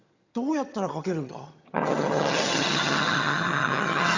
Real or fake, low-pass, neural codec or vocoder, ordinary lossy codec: fake; 7.2 kHz; vocoder, 22.05 kHz, 80 mel bands, HiFi-GAN; Opus, 64 kbps